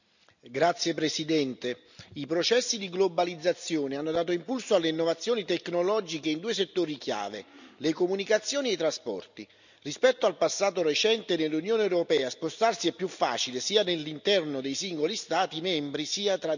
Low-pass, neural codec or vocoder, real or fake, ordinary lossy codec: 7.2 kHz; none; real; none